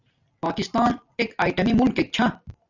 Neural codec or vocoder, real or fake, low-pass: none; real; 7.2 kHz